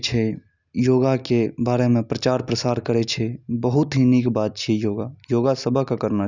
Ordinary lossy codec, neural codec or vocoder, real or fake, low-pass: none; none; real; 7.2 kHz